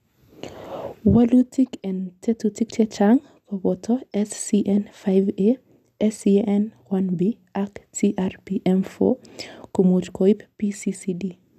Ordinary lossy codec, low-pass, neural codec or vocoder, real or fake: none; 14.4 kHz; none; real